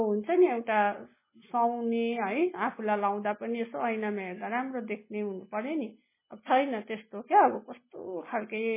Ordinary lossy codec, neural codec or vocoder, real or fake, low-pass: MP3, 16 kbps; none; real; 3.6 kHz